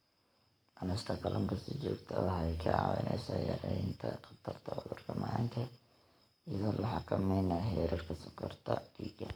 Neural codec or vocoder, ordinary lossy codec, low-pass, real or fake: codec, 44.1 kHz, 7.8 kbps, Pupu-Codec; none; none; fake